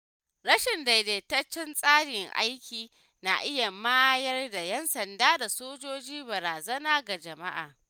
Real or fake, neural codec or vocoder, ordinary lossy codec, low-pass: real; none; none; none